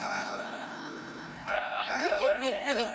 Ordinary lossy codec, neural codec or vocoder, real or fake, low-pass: none; codec, 16 kHz, 0.5 kbps, FreqCodec, larger model; fake; none